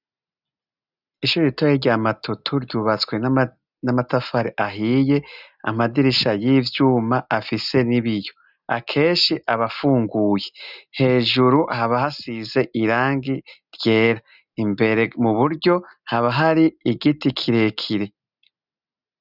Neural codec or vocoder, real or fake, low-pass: none; real; 5.4 kHz